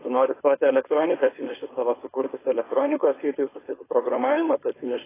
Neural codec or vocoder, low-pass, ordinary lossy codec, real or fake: codec, 16 kHz, 4.8 kbps, FACodec; 3.6 kHz; AAC, 16 kbps; fake